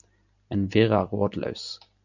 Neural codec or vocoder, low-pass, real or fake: none; 7.2 kHz; real